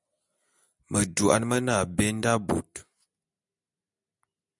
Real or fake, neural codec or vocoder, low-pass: real; none; 10.8 kHz